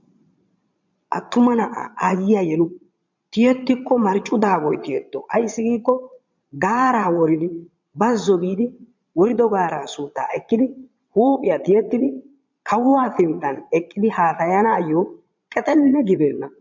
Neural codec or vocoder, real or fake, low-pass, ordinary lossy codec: vocoder, 22.05 kHz, 80 mel bands, Vocos; fake; 7.2 kHz; MP3, 64 kbps